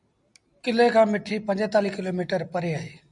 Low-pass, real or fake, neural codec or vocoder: 10.8 kHz; real; none